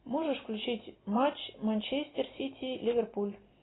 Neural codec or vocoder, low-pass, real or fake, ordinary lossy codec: none; 7.2 kHz; real; AAC, 16 kbps